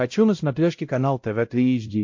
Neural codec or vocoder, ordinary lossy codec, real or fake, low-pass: codec, 16 kHz, 0.5 kbps, X-Codec, WavLM features, trained on Multilingual LibriSpeech; MP3, 48 kbps; fake; 7.2 kHz